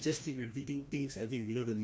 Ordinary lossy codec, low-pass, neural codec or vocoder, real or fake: none; none; codec, 16 kHz, 1 kbps, FreqCodec, larger model; fake